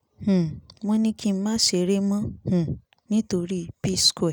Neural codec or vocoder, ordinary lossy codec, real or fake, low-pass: none; none; real; none